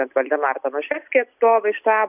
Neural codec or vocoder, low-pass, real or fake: none; 3.6 kHz; real